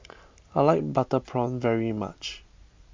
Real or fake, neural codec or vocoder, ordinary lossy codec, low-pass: real; none; MP3, 64 kbps; 7.2 kHz